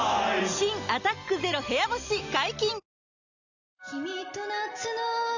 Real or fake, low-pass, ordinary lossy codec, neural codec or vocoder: real; 7.2 kHz; none; none